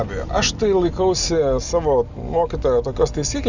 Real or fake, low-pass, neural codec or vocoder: real; 7.2 kHz; none